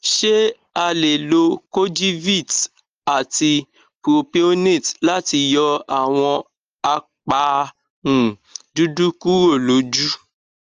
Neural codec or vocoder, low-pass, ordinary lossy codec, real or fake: none; 7.2 kHz; Opus, 24 kbps; real